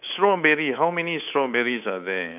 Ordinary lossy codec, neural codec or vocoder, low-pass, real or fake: none; codec, 24 kHz, 3.1 kbps, DualCodec; 3.6 kHz; fake